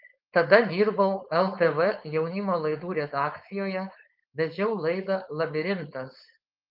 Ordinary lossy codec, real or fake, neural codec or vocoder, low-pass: Opus, 24 kbps; fake; codec, 16 kHz, 4.8 kbps, FACodec; 5.4 kHz